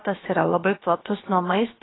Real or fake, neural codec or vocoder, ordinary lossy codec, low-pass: fake; codec, 16 kHz, about 1 kbps, DyCAST, with the encoder's durations; AAC, 16 kbps; 7.2 kHz